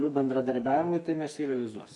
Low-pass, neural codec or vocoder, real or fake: 10.8 kHz; codec, 44.1 kHz, 2.6 kbps, SNAC; fake